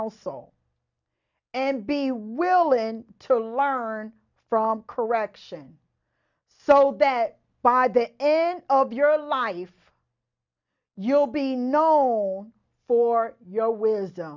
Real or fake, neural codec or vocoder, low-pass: real; none; 7.2 kHz